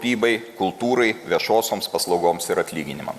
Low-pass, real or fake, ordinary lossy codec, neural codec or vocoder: 14.4 kHz; real; Opus, 64 kbps; none